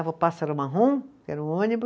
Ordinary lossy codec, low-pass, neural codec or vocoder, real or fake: none; none; none; real